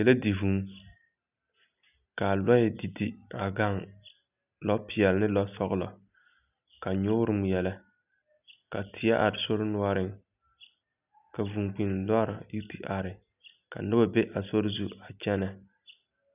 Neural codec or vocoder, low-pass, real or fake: none; 3.6 kHz; real